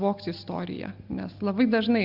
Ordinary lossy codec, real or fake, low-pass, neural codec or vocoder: Opus, 64 kbps; real; 5.4 kHz; none